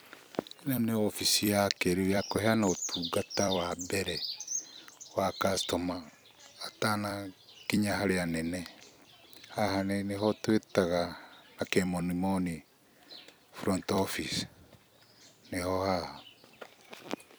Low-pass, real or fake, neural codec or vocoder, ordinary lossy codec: none; fake; vocoder, 44.1 kHz, 128 mel bands every 256 samples, BigVGAN v2; none